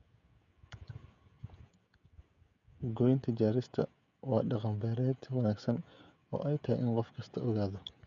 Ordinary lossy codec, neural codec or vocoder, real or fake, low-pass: none; codec, 16 kHz, 16 kbps, FreqCodec, smaller model; fake; 7.2 kHz